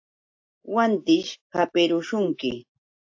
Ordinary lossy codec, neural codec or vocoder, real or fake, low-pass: AAC, 48 kbps; none; real; 7.2 kHz